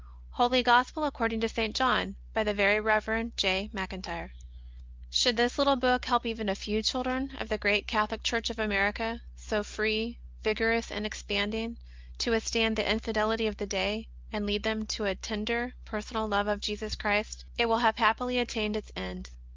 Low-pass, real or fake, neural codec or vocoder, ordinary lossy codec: 7.2 kHz; real; none; Opus, 32 kbps